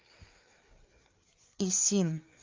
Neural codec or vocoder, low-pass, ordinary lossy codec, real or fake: codec, 16 kHz, 4 kbps, FreqCodec, larger model; 7.2 kHz; Opus, 24 kbps; fake